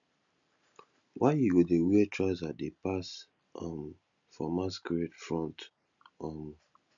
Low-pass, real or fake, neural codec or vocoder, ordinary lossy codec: 7.2 kHz; real; none; none